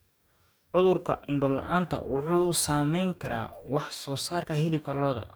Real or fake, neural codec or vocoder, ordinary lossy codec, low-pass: fake; codec, 44.1 kHz, 2.6 kbps, DAC; none; none